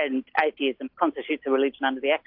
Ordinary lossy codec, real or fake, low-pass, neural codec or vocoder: AAC, 48 kbps; real; 5.4 kHz; none